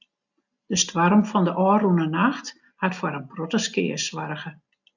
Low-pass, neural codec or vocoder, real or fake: 7.2 kHz; none; real